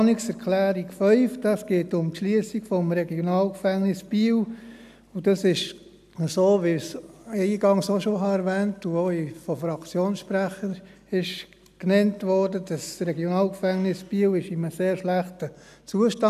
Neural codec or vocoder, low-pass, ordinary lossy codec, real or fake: none; 14.4 kHz; none; real